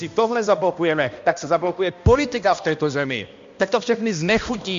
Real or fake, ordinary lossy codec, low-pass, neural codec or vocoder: fake; MP3, 48 kbps; 7.2 kHz; codec, 16 kHz, 1 kbps, X-Codec, HuBERT features, trained on balanced general audio